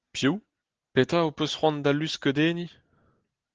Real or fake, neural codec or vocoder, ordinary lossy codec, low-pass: real; none; Opus, 16 kbps; 7.2 kHz